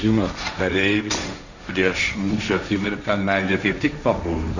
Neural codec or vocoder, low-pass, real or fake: codec, 16 kHz, 1.1 kbps, Voila-Tokenizer; 7.2 kHz; fake